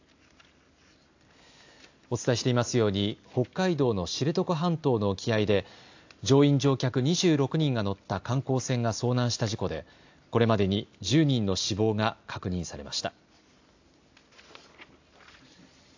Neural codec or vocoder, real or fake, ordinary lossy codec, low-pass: none; real; AAC, 48 kbps; 7.2 kHz